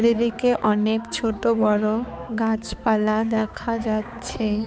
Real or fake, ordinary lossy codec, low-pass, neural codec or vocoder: fake; none; none; codec, 16 kHz, 4 kbps, X-Codec, HuBERT features, trained on balanced general audio